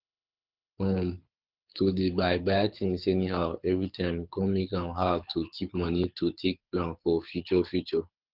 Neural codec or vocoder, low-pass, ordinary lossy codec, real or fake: codec, 24 kHz, 6 kbps, HILCodec; 5.4 kHz; Opus, 32 kbps; fake